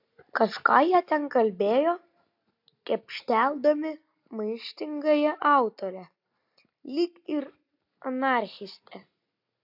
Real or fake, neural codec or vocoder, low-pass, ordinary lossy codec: real; none; 5.4 kHz; AAC, 48 kbps